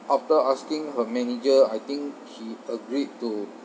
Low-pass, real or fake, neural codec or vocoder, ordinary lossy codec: none; real; none; none